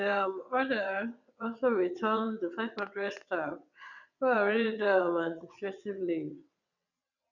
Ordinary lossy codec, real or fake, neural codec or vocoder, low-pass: none; fake; vocoder, 22.05 kHz, 80 mel bands, WaveNeXt; 7.2 kHz